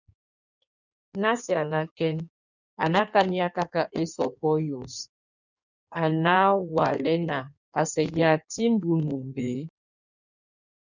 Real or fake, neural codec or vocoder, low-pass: fake; codec, 16 kHz in and 24 kHz out, 1.1 kbps, FireRedTTS-2 codec; 7.2 kHz